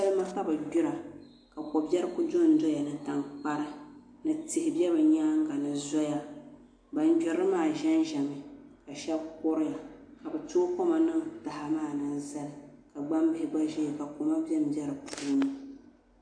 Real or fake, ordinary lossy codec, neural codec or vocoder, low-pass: real; AAC, 64 kbps; none; 9.9 kHz